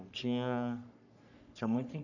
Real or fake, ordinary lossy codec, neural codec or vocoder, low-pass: fake; none; codec, 44.1 kHz, 3.4 kbps, Pupu-Codec; 7.2 kHz